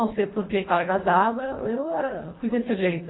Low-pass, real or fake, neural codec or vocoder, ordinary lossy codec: 7.2 kHz; fake; codec, 24 kHz, 1.5 kbps, HILCodec; AAC, 16 kbps